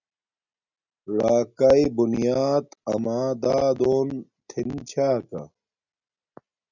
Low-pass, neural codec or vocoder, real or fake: 7.2 kHz; none; real